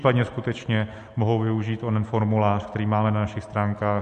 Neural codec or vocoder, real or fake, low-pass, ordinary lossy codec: none; real; 14.4 kHz; MP3, 48 kbps